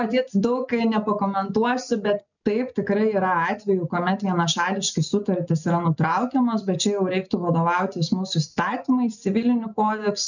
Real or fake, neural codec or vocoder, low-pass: real; none; 7.2 kHz